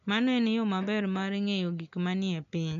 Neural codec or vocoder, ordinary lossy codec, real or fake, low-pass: none; none; real; 7.2 kHz